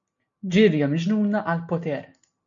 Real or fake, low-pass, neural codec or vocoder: real; 7.2 kHz; none